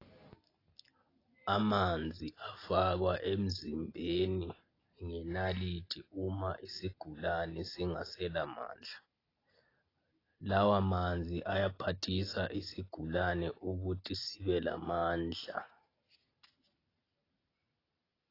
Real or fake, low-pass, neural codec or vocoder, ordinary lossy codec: real; 5.4 kHz; none; AAC, 24 kbps